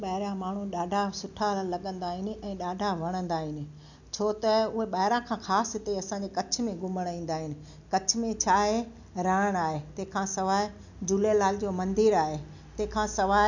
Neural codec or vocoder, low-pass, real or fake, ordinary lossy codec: none; 7.2 kHz; real; none